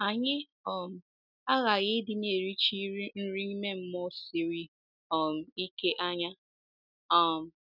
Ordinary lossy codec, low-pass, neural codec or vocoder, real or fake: none; 5.4 kHz; none; real